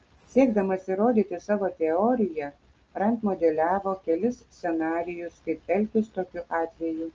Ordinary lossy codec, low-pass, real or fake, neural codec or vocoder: Opus, 24 kbps; 7.2 kHz; real; none